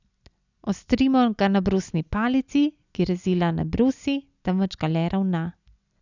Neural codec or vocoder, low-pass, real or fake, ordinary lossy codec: none; 7.2 kHz; real; none